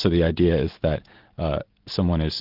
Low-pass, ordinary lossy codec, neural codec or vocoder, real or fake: 5.4 kHz; Opus, 32 kbps; none; real